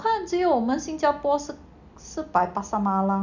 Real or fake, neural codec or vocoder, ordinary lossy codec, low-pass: real; none; none; 7.2 kHz